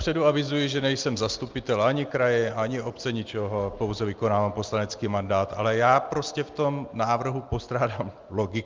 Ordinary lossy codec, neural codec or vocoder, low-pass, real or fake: Opus, 24 kbps; none; 7.2 kHz; real